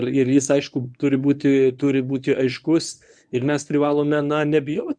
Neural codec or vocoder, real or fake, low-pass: codec, 24 kHz, 0.9 kbps, WavTokenizer, medium speech release version 1; fake; 9.9 kHz